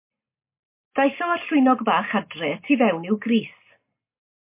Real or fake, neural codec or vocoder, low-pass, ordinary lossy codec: real; none; 3.6 kHz; MP3, 24 kbps